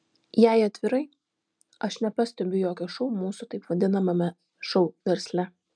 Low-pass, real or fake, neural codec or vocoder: 9.9 kHz; real; none